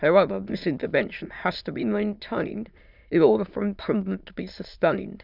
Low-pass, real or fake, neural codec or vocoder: 5.4 kHz; fake; autoencoder, 22.05 kHz, a latent of 192 numbers a frame, VITS, trained on many speakers